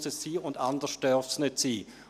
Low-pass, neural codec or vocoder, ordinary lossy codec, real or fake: 14.4 kHz; none; none; real